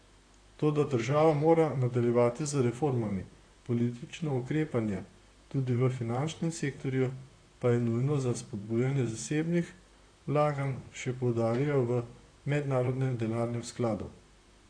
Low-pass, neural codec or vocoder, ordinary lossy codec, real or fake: 9.9 kHz; vocoder, 44.1 kHz, 128 mel bands, Pupu-Vocoder; AAC, 48 kbps; fake